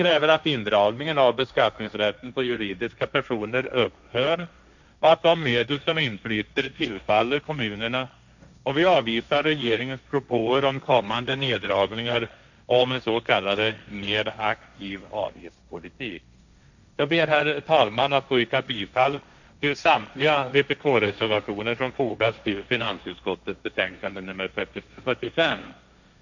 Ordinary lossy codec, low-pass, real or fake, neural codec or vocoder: none; 7.2 kHz; fake; codec, 16 kHz, 1.1 kbps, Voila-Tokenizer